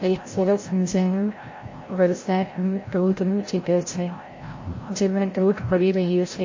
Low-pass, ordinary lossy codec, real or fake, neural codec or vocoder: 7.2 kHz; MP3, 32 kbps; fake; codec, 16 kHz, 0.5 kbps, FreqCodec, larger model